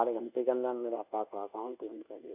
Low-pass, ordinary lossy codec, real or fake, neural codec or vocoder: 3.6 kHz; none; fake; codec, 24 kHz, 1.2 kbps, DualCodec